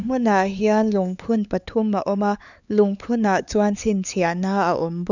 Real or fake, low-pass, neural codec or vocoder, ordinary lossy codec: fake; 7.2 kHz; codec, 16 kHz, 4 kbps, X-Codec, HuBERT features, trained on LibriSpeech; none